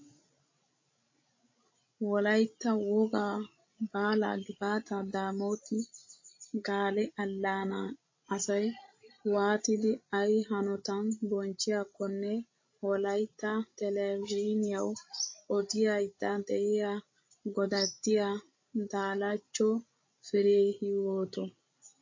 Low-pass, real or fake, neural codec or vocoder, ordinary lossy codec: 7.2 kHz; fake; codec, 16 kHz, 16 kbps, FreqCodec, larger model; MP3, 32 kbps